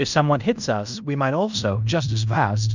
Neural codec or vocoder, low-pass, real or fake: codec, 16 kHz in and 24 kHz out, 0.9 kbps, LongCat-Audio-Codec, fine tuned four codebook decoder; 7.2 kHz; fake